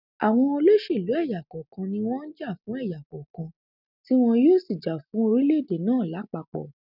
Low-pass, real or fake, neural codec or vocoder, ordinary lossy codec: 5.4 kHz; real; none; none